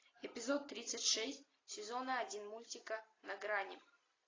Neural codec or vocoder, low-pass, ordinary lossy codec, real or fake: none; 7.2 kHz; AAC, 32 kbps; real